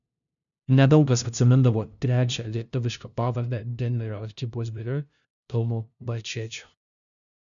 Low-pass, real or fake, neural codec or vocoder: 7.2 kHz; fake; codec, 16 kHz, 0.5 kbps, FunCodec, trained on LibriTTS, 25 frames a second